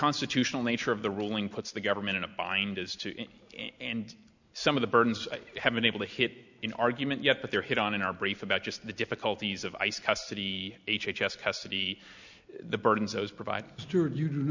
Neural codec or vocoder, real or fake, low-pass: none; real; 7.2 kHz